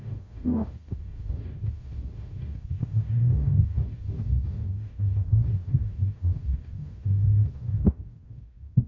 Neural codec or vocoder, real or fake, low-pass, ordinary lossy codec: codec, 44.1 kHz, 0.9 kbps, DAC; fake; 7.2 kHz; AAC, 32 kbps